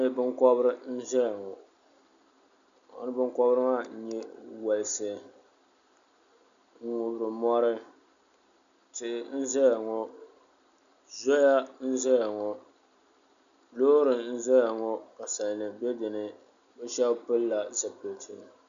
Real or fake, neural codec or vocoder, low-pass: real; none; 7.2 kHz